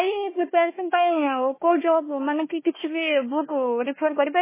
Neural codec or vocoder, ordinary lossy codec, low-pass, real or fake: codec, 16 kHz, 1 kbps, FunCodec, trained on Chinese and English, 50 frames a second; MP3, 16 kbps; 3.6 kHz; fake